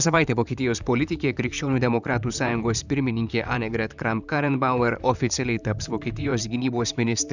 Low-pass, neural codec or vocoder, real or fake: 7.2 kHz; vocoder, 44.1 kHz, 128 mel bands, Pupu-Vocoder; fake